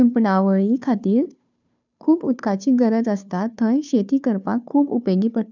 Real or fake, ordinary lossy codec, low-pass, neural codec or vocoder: fake; none; 7.2 kHz; codec, 16 kHz, 2 kbps, FunCodec, trained on Chinese and English, 25 frames a second